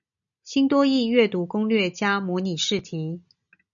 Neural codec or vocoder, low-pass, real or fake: none; 7.2 kHz; real